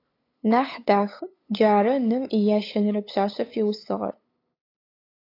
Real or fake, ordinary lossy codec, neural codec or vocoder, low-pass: fake; AAC, 32 kbps; codec, 16 kHz, 8 kbps, FunCodec, trained on LibriTTS, 25 frames a second; 5.4 kHz